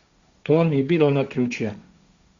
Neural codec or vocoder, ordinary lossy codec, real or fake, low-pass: codec, 16 kHz, 1.1 kbps, Voila-Tokenizer; Opus, 64 kbps; fake; 7.2 kHz